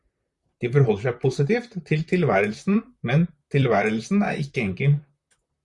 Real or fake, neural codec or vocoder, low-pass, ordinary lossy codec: fake; vocoder, 44.1 kHz, 128 mel bands, Pupu-Vocoder; 10.8 kHz; Opus, 64 kbps